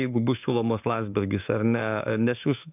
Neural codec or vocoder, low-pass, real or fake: autoencoder, 48 kHz, 32 numbers a frame, DAC-VAE, trained on Japanese speech; 3.6 kHz; fake